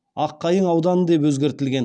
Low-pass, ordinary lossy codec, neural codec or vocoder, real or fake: none; none; none; real